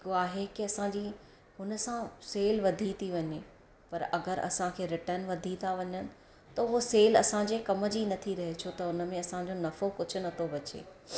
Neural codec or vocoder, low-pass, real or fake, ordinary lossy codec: none; none; real; none